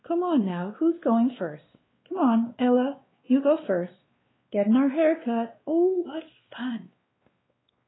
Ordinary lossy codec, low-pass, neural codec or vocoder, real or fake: AAC, 16 kbps; 7.2 kHz; codec, 16 kHz, 2 kbps, X-Codec, WavLM features, trained on Multilingual LibriSpeech; fake